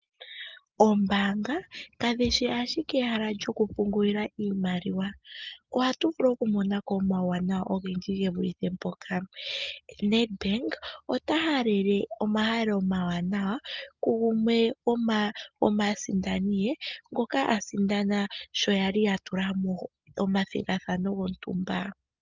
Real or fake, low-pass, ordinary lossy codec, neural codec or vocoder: real; 7.2 kHz; Opus, 24 kbps; none